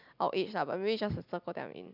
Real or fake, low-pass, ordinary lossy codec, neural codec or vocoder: real; 5.4 kHz; none; none